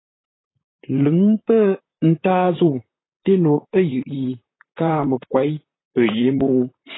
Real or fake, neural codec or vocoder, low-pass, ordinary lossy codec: fake; vocoder, 44.1 kHz, 128 mel bands, Pupu-Vocoder; 7.2 kHz; AAC, 16 kbps